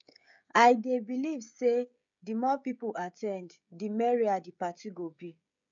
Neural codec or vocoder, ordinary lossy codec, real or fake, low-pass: codec, 16 kHz, 16 kbps, FreqCodec, smaller model; MP3, 64 kbps; fake; 7.2 kHz